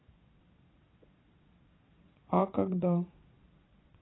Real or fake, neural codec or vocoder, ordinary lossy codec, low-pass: fake; vocoder, 22.05 kHz, 80 mel bands, Vocos; AAC, 16 kbps; 7.2 kHz